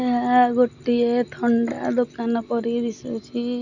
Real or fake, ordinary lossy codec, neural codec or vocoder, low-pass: real; none; none; 7.2 kHz